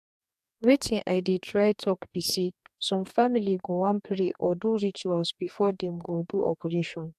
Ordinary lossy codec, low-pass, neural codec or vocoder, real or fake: AAC, 96 kbps; 14.4 kHz; codec, 44.1 kHz, 2.6 kbps, DAC; fake